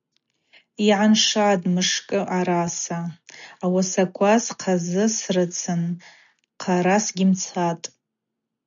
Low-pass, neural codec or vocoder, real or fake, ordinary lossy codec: 7.2 kHz; none; real; MP3, 96 kbps